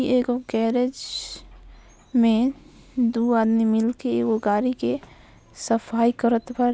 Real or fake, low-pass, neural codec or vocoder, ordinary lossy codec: real; none; none; none